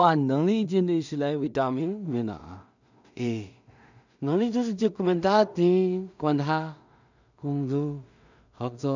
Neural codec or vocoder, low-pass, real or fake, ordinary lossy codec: codec, 16 kHz in and 24 kHz out, 0.4 kbps, LongCat-Audio-Codec, two codebook decoder; 7.2 kHz; fake; none